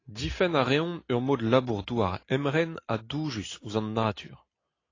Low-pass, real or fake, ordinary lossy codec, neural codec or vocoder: 7.2 kHz; real; AAC, 32 kbps; none